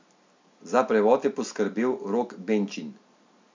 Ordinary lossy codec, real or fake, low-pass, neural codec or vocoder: MP3, 64 kbps; real; 7.2 kHz; none